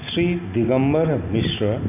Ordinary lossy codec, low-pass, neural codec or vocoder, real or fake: none; 3.6 kHz; none; real